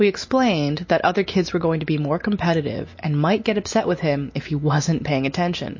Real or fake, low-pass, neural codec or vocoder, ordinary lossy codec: real; 7.2 kHz; none; MP3, 32 kbps